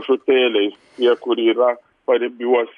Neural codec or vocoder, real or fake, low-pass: none; real; 10.8 kHz